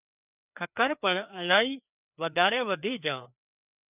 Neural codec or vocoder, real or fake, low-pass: codec, 16 kHz, 2 kbps, FreqCodec, larger model; fake; 3.6 kHz